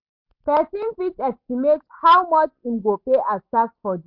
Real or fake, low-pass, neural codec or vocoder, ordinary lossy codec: real; 5.4 kHz; none; MP3, 48 kbps